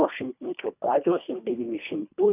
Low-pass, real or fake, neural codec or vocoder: 3.6 kHz; fake; codec, 24 kHz, 1.5 kbps, HILCodec